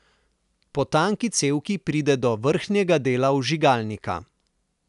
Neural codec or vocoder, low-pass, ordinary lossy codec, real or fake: none; 10.8 kHz; none; real